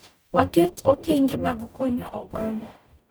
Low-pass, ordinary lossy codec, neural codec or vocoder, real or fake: none; none; codec, 44.1 kHz, 0.9 kbps, DAC; fake